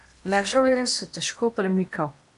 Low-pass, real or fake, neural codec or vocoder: 10.8 kHz; fake; codec, 16 kHz in and 24 kHz out, 0.8 kbps, FocalCodec, streaming, 65536 codes